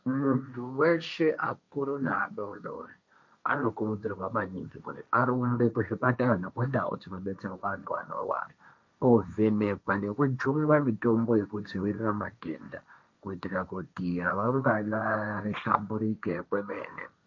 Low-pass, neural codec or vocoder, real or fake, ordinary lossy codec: 7.2 kHz; codec, 16 kHz, 1.1 kbps, Voila-Tokenizer; fake; MP3, 48 kbps